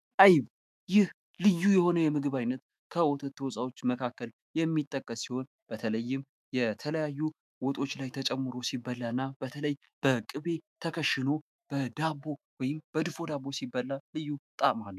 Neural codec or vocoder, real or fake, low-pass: autoencoder, 48 kHz, 128 numbers a frame, DAC-VAE, trained on Japanese speech; fake; 14.4 kHz